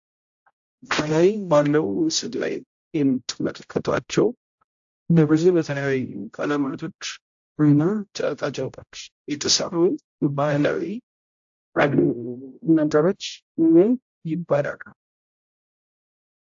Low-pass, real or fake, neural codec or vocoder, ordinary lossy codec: 7.2 kHz; fake; codec, 16 kHz, 0.5 kbps, X-Codec, HuBERT features, trained on general audio; AAC, 64 kbps